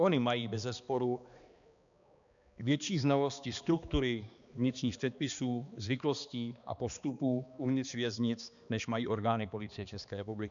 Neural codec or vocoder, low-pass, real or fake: codec, 16 kHz, 2 kbps, X-Codec, HuBERT features, trained on balanced general audio; 7.2 kHz; fake